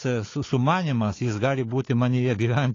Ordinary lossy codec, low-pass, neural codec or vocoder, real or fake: AAC, 32 kbps; 7.2 kHz; codec, 16 kHz, 4 kbps, FunCodec, trained on Chinese and English, 50 frames a second; fake